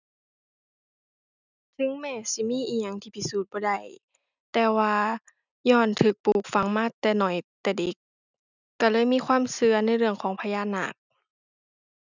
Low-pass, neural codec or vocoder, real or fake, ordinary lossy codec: 7.2 kHz; none; real; none